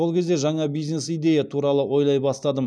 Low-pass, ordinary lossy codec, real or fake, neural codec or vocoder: none; none; real; none